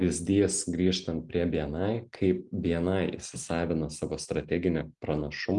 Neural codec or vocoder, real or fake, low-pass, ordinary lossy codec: none; real; 10.8 kHz; Opus, 32 kbps